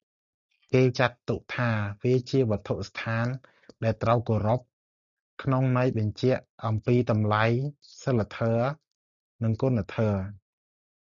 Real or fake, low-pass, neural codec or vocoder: real; 7.2 kHz; none